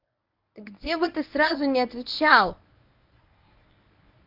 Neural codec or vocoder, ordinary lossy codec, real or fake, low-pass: codec, 24 kHz, 0.9 kbps, WavTokenizer, medium speech release version 1; none; fake; 5.4 kHz